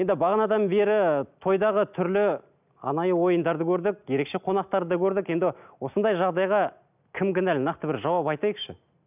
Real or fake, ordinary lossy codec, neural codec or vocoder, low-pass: real; none; none; 3.6 kHz